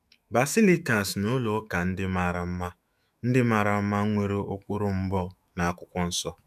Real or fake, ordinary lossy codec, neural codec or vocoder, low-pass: fake; none; autoencoder, 48 kHz, 128 numbers a frame, DAC-VAE, trained on Japanese speech; 14.4 kHz